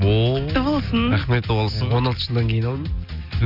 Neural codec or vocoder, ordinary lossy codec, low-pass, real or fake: none; none; 5.4 kHz; real